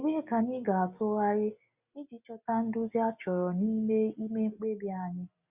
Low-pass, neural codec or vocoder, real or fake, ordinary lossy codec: 3.6 kHz; none; real; Opus, 64 kbps